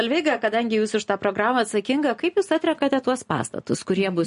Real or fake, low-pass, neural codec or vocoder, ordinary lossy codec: real; 10.8 kHz; none; MP3, 48 kbps